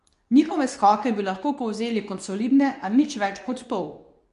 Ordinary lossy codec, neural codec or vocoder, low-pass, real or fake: MP3, 96 kbps; codec, 24 kHz, 0.9 kbps, WavTokenizer, medium speech release version 2; 10.8 kHz; fake